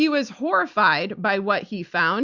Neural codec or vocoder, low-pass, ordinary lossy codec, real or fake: vocoder, 44.1 kHz, 128 mel bands every 256 samples, BigVGAN v2; 7.2 kHz; Opus, 64 kbps; fake